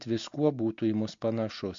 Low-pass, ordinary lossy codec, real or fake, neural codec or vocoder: 7.2 kHz; MP3, 48 kbps; real; none